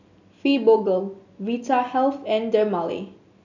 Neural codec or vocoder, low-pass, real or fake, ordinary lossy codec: none; 7.2 kHz; real; none